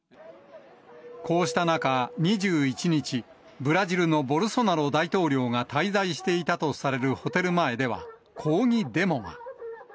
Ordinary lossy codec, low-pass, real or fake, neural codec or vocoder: none; none; real; none